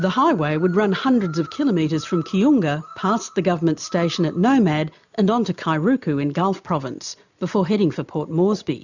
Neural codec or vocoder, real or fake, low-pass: none; real; 7.2 kHz